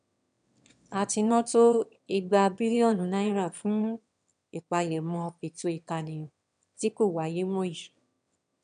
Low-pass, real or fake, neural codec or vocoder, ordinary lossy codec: 9.9 kHz; fake; autoencoder, 22.05 kHz, a latent of 192 numbers a frame, VITS, trained on one speaker; none